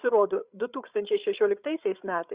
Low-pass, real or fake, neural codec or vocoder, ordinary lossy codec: 3.6 kHz; fake; codec, 16 kHz, 8 kbps, FreqCodec, larger model; Opus, 64 kbps